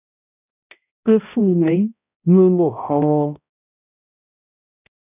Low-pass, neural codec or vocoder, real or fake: 3.6 kHz; codec, 16 kHz, 0.5 kbps, X-Codec, HuBERT features, trained on balanced general audio; fake